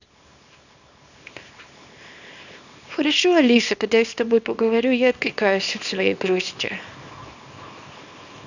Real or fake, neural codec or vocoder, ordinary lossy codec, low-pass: fake; codec, 24 kHz, 0.9 kbps, WavTokenizer, small release; none; 7.2 kHz